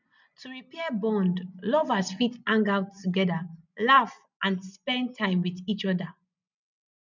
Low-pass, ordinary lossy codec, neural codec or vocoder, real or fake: 7.2 kHz; none; none; real